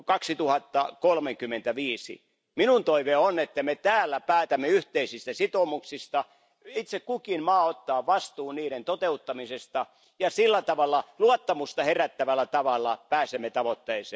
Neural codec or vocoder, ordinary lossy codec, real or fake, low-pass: none; none; real; none